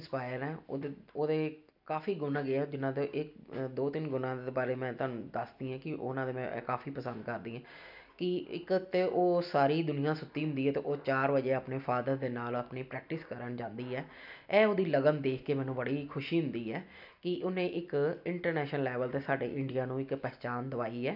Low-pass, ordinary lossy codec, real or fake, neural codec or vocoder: 5.4 kHz; none; real; none